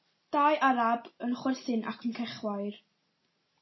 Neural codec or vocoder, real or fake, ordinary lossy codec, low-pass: none; real; MP3, 24 kbps; 7.2 kHz